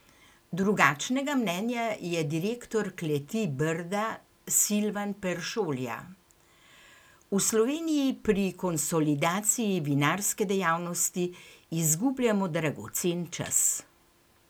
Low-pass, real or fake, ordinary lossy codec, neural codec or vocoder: none; real; none; none